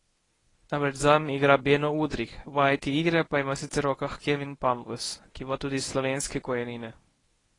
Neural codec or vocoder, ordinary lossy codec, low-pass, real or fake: codec, 24 kHz, 0.9 kbps, WavTokenizer, medium speech release version 1; AAC, 32 kbps; 10.8 kHz; fake